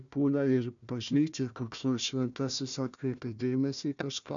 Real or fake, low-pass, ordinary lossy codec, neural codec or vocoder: fake; 7.2 kHz; MP3, 96 kbps; codec, 16 kHz, 1 kbps, FunCodec, trained on Chinese and English, 50 frames a second